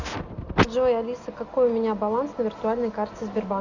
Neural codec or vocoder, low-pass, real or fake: none; 7.2 kHz; real